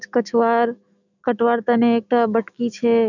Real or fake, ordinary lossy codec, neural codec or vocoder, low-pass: fake; none; autoencoder, 48 kHz, 128 numbers a frame, DAC-VAE, trained on Japanese speech; 7.2 kHz